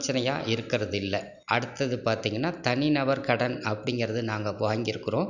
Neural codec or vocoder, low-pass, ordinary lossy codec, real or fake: none; 7.2 kHz; none; real